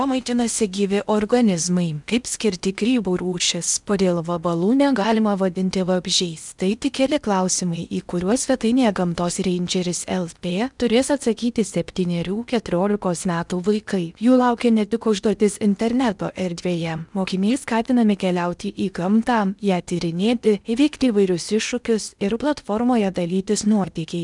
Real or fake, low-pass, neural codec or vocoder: fake; 10.8 kHz; codec, 16 kHz in and 24 kHz out, 0.8 kbps, FocalCodec, streaming, 65536 codes